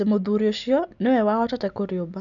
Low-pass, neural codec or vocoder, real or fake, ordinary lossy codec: 7.2 kHz; none; real; none